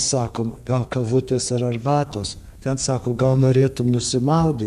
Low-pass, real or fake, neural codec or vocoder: 14.4 kHz; fake; codec, 32 kHz, 1.9 kbps, SNAC